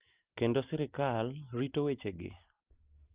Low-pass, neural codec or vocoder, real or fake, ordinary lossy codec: 3.6 kHz; none; real; Opus, 32 kbps